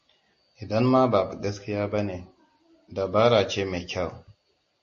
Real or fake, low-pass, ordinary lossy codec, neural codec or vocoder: real; 7.2 kHz; MP3, 32 kbps; none